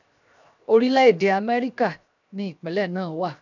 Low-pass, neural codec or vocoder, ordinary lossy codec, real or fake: 7.2 kHz; codec, 16 kHz, 0.7 kbps, FocalCodec; none; fake